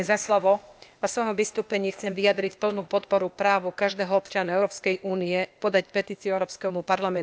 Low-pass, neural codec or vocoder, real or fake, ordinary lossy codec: none; codec, 16 kHz, 0.8 kbps, ZipCodec; fake; none